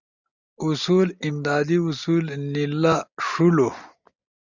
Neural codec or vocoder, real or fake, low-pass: none; real; 7.2 kHz